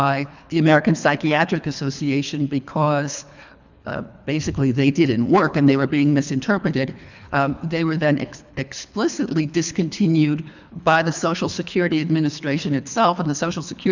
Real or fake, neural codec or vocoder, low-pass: fake; codec, 24 kHz, 3 kbps, HILCodec; 7.2 kHz